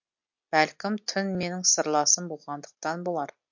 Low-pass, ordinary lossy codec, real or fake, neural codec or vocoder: 7.2 kHz; MP3, 48 kbps; real; none